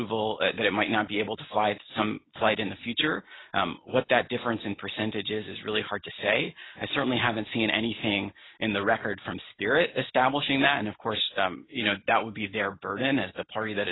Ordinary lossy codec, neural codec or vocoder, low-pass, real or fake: AAC, 16 kbps; none; 7.2 kHz; real